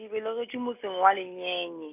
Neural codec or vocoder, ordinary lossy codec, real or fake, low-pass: none; none; real; 3.6 kHz